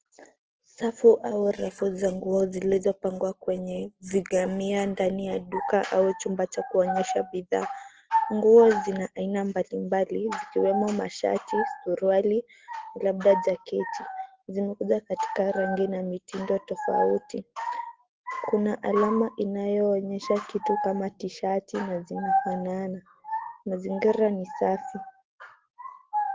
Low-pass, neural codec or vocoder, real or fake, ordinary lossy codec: 7.2 kHz; none; real; Opus, 16 kbps